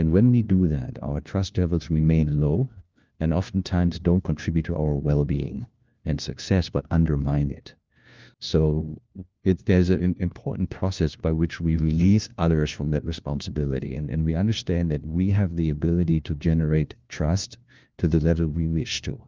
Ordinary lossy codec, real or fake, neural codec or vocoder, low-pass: Opus, 32 kbps; fake; codec, 16 kHz, 1 kbps, FunCodec, trained on LibriTTS, 50 frames a second; 7.2 kHz